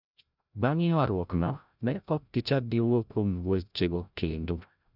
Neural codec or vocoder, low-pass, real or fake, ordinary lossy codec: codec, 16 kHz, 0.5 kbps, FreqCodec, larger model; 5.4 kHz; fake; none